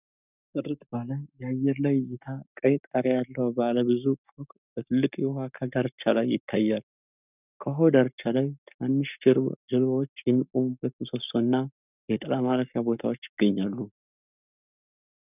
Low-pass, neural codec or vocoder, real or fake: 3.6 kHz; codec, 44.1 kHz, 7.8 kbps, Pupu-Codec; fake